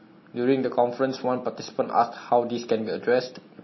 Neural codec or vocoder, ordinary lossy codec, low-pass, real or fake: none; MP3, 24 kbps; 7.2 kHz; real